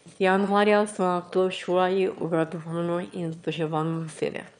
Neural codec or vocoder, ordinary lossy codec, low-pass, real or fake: autoencoder, 22.05 kHz, a latent of 192 numbers a frame, VITS, trained on one speaker; none; 9.9 kHz; fake